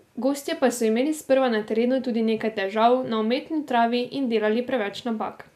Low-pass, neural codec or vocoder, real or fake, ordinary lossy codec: 14.4 kHz; none; real; none